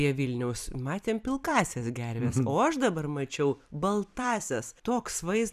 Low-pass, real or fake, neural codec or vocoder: 14.4 kHz; fake; vocoder, 44.1 kHz, 128 mel bands every 256 samples, BigVGAN v2